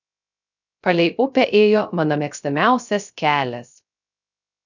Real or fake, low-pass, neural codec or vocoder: fake; 7.2 kHz; codec, 16 kHz, 0.3 kbps, FocalCodec